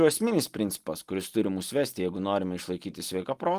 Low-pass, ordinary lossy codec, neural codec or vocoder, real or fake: 14.4 kHz; Opus, 24 kbps; none; real